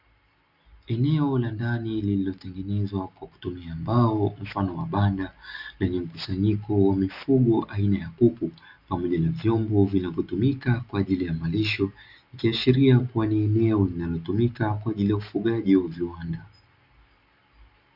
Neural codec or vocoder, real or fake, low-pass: none; real; 5.4 kHz